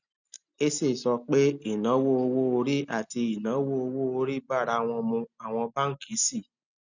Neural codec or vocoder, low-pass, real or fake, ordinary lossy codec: none; 7.2 kHz; real; none